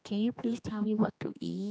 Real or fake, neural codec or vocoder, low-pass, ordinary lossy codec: fake; codec, 16 kHz, 2 kbps, X-Codec, HuBERT features, trained on general audio; none; none